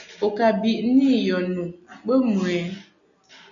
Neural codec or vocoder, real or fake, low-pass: none; real; 7.2 kHz